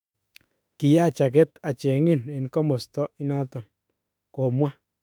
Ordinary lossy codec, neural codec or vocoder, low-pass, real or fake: none; autoencoder, 48 kHz, 32 numbers a frame, DAC-VAE, trained on Japanese speech; 19.8 kHz; fake